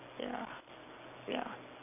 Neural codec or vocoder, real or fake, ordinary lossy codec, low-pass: none; real; none; 3.6 kHz